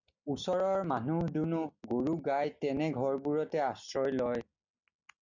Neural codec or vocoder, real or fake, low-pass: none; real; 7.2 kHz